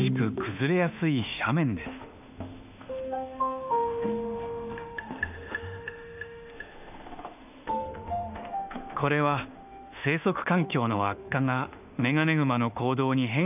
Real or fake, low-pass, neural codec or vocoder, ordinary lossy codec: fake; 3.6 kHz; autoencoder, 48 kHz, 32 numbers a frame, DAC-VAE, trained on Japanese speech; none